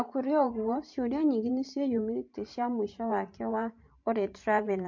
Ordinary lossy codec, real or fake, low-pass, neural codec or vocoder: MP3, 48 kbps; fake; 7.2 kHz; vocoder, 44.1 kHz, 128 mel bands every 512 samples, BigVGAN v2